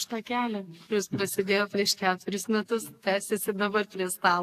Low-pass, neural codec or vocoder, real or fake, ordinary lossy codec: 14.4 kHz; codec, 44.1 kHz, 7.8 kbps, DAC; fake; AAC, 64 kbps